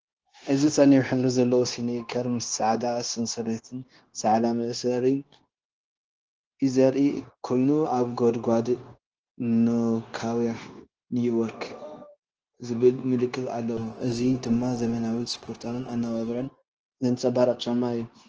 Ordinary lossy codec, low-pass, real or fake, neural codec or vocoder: Opus, 16 kbps; 7.2 kHz; fake; codec, 16 kHz, 0.9 kbps, LongCat-Audio-Codec